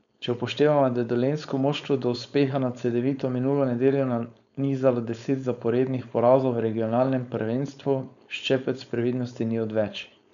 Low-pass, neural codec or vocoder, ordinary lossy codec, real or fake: 7.2 kHz; codec, 16 kHz, 4.8 kbps, FACodec; none; fake